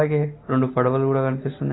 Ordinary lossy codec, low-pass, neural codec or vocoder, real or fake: AAC, 16 kbps; 7.2 kHz; none; real